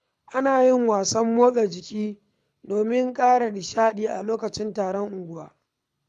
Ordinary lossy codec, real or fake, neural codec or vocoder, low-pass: none; fake; codec, 24 kHz, 6 kbps, HILCodec; none